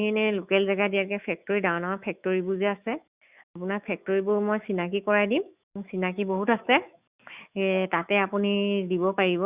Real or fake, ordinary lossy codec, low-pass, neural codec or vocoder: fake; Opus, 64 kbps; 3.6 kHz; autoencoder, 48 kHz, 128 numbers a frame, DAC-VAE, trained on Japanese speech